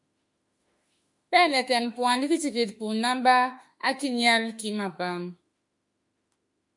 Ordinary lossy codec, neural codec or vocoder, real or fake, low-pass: MP3, 64 kbps; autoencoder, 48 kHz, 32 numbers a frame, DAC-VAE, trained on Japanese speech; fake; 10.8 kHz